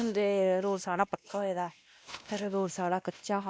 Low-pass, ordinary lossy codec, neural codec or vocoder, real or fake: none; none; codec, 16 kHz, 1 kbps, X-Codec, WavLM features, trained on Multilingual LibriSpeech; fake